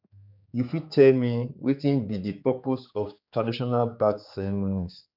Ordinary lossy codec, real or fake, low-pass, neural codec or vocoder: none; fake; 5.4 kHz; codec, 16 kHz, 4 kbps, X-Codec, HuBERT features, trained on general audio